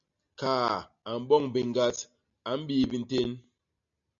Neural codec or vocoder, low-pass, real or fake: none; 7.2 kHz; real